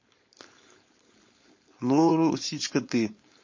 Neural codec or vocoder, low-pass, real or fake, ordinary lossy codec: codec, 16 kHz, 4.8 kbps, FACodec; 7.2 kHz; fake; MP3, 32 kbps